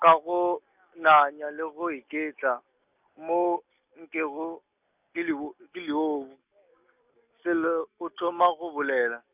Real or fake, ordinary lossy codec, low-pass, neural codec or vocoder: real; none; 3.6 kHz; none